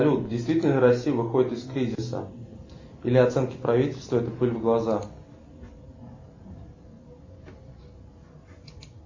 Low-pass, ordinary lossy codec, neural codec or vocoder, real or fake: 7.2 kHz; MP3, 32 kbps; none; real